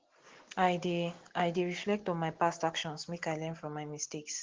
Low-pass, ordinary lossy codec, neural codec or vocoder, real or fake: 7.2 kHz; Opus, 16 kbps; none; real